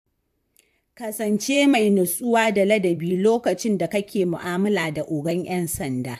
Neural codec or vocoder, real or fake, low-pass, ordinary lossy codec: vocoder, 44.1 kHz, 128 mel bands, Pupu-Vocoder; fake; 14.4 kHz; AAC, 96 kbps